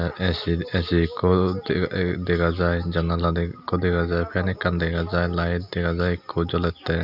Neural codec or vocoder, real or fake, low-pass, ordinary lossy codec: none; real; 5.4 kHz; none